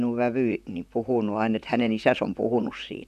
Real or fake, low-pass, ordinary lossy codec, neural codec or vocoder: fake; 14.4 kHz; none; vocoder, 44.1 kHz, 128 mel bands, Pupu-Vocoder